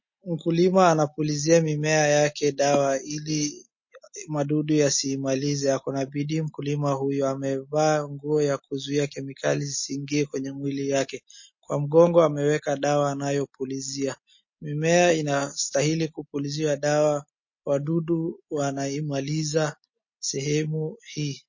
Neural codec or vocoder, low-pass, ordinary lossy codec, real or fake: none; 7.2 kHz; MP3, 32 kbps; real